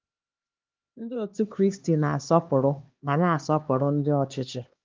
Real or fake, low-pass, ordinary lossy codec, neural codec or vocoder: fake; 7.2 kHz; Opus, 32 kbps; codec, 16 kHz, 2 kbps, X-Codec, HuBERT features, trained on LibriSpeech